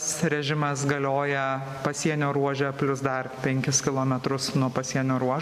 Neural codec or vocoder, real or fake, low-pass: none; real; 14.4 kHz